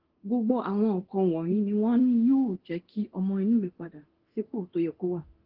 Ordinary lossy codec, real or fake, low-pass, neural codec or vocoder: Opus, 16 kbps; fake; 5.4 kHz; codec, 24 kHz, 0.9 kbps, DualCodec